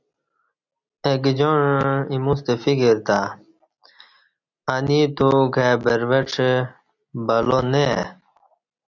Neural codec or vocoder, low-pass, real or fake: none; 7.2 kHz; real